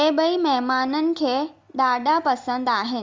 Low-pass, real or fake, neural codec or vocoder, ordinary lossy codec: 7.2 kHz; real; none; Opus, 32 kbps